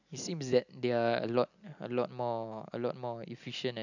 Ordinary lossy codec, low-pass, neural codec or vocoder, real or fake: none; 7.2 kHz; none; real